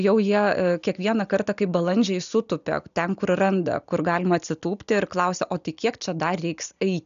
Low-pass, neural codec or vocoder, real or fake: 7.2 kHz; none; real